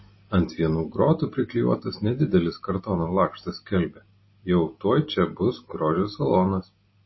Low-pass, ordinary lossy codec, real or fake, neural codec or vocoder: 7.2 kHz; MP3, 24 kbps; real; none